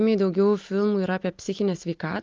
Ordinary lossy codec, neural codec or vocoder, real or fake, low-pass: Opus, 24 kbps; none; real; 7.2 kHz